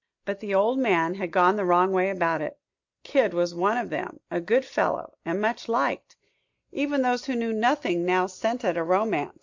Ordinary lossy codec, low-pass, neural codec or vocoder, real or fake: MP3, 64 kbps; 7.2 kHz; none; real